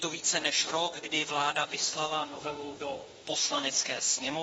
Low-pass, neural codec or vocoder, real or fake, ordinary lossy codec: 19.8 kHz; autoencoder, 48 kHz, 32 numbers a frame, DAC-VAE, trained on Japanese speech; fake; AAC, 24 kbps